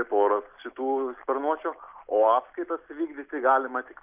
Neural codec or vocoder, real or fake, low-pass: none; real; 3.6 kHz